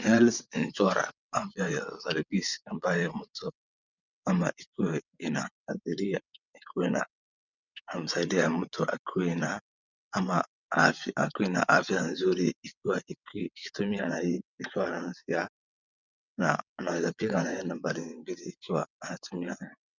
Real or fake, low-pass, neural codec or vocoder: fake; 7.2 kHz; vocoder, 22.05 kHz, 80 mel bands, WaveNeXt